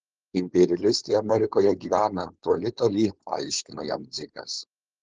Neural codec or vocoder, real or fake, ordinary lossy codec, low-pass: codec, 16 kHz, 4.8 kbps, FACodec; fake; Opus, 16 kbps; 7.2 kHz